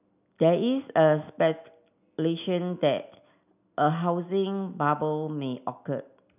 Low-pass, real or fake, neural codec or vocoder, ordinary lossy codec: 3.6 kHz; real; none; none